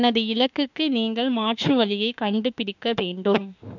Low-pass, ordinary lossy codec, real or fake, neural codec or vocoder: 7.2 kHz; none; fake; autoencoder, 48 kHz, 32 numbers a frame, DAC-VAE, trained on Japanese speech